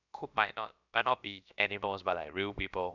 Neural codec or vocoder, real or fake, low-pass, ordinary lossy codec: codec, 16 kHz, about 1 kbps, DyCAST, with the encoder's durations; fake; 7.2 kHz; none